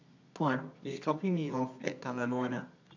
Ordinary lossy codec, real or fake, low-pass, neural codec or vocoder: AAC, 48 kbps; fake; 7.2 kHz; codec, 24 kHz, 0.9 kbps, WavTokenizer, medium music audio release